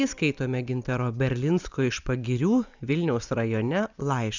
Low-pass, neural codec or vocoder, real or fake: 7.2 kHz; vocoder, 44.1 kHz, 128 mel bands every 256 samples, BigVGAN v2; fake